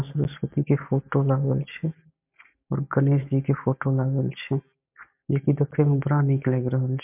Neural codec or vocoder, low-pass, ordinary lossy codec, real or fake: none; 3.6 kHz; MP3, 32 kbps; real